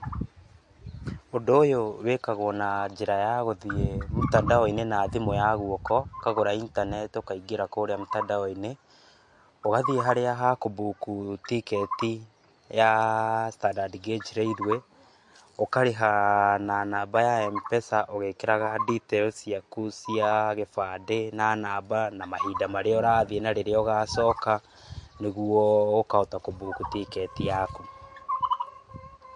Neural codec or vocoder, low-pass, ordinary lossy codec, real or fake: none; 10.8 kHz; MP3, 48 kbps; real